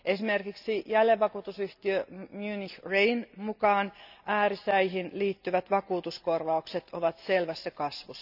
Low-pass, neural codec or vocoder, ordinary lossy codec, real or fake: 5.4 kHz; none; none; real